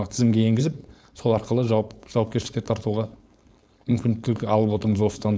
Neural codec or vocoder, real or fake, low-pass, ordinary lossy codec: codec, 16 kHz, 4.8 kbps, FACodec; fake; none; none